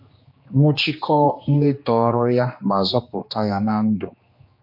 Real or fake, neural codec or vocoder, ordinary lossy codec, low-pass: fake; codec, 16 kHz, 2 kbps, X-Codec, HuBERT features, trained on general audio; MP3, 32 kbps; 5.4 kHz